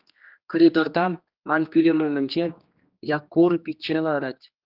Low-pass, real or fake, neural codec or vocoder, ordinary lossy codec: 5.4 kHz; fake; codec, 16 kHz, 2 kbps, X-Codec, HuBERT features, trained on general audio; Opus, 24 kbps